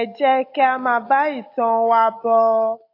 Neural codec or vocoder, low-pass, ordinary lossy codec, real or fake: none; 5.4 kHz; AAC, 32 kbps; real